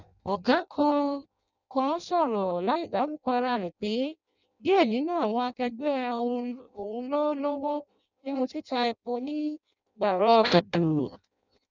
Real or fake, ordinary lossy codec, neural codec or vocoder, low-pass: fake; none; codec, 16 kHz in and 24 kHz out, 0.6 kbps, FireRedTTS-2 codec; 7.2 kHz